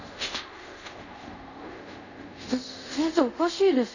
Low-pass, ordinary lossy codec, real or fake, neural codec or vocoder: 7.2 kHz; none; fake; codec, 24 kHz, 0.5 kbps, DualCodec